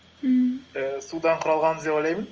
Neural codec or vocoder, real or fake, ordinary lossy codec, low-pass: none; real; Opus, 24 kbps; 7.2 kHz